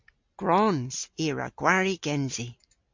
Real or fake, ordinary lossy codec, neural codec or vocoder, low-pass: real; MP3, 48 kbps; none; 7.2 kHz